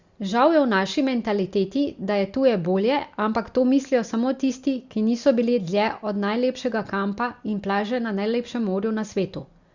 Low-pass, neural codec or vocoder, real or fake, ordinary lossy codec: 7.2 kHz; none; real; Opus, 64 kbps